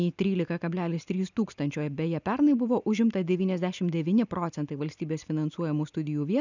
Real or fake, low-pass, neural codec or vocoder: real; 7.2 kHz; none